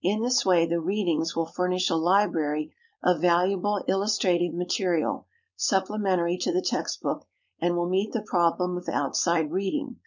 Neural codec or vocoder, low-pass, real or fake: codec, 16 kHz, 4.8 kbps, FACodec; 7.2 kHz; fake